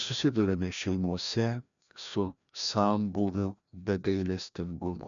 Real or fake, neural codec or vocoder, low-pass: fake; codec, 16 kHz, 1 kbps, FreqCodec, larger model; 7.2 kHz